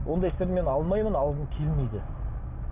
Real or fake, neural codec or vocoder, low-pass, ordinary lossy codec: real; none; 3.6 kHz; Opus, 64 kbps